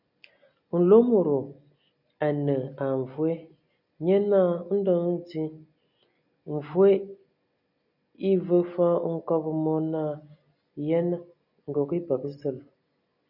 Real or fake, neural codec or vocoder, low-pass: real; none; 5.4 kHz